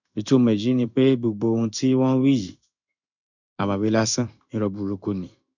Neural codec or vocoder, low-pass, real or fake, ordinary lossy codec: codec, 16 kHz in and 24 kHz out, 1 kbps, XY-Tokenizer; 7.2 kHz; fake; none